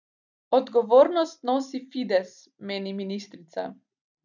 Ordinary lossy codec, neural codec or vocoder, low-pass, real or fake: none; none; 7.2 kHz; real